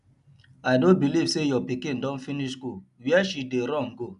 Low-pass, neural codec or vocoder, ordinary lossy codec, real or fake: 10.8 kHz; none; MP3, 96 kbps; real